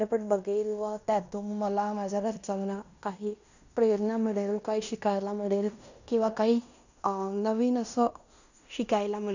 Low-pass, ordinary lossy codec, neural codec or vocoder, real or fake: 7.2 kHz; none; codec, 16 kHz in and 24 kHz out, 0.9 kbps, LongCat-Audio-Codec, fine tuned four codebook decoder; fake